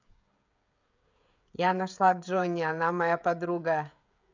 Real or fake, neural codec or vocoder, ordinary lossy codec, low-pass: fake; codec, 16 kHz, 16 kbps, FreqCodec, smaller model; none; 7.2 kHz